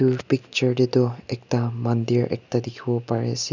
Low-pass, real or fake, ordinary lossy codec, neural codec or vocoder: 7.2 kHz; real; none; none